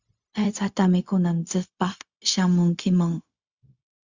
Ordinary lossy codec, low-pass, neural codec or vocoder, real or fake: Opus, 64 kbps; 7.2 kHz; codec, 16 kHz, 0.4 kbps, LongCat-Audio-Codec; fake